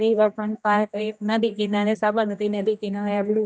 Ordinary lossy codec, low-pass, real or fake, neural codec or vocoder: none; none; fake; codec, 16 kHz, 1 kbps, X-Codec, HuBERT features, trained on general audio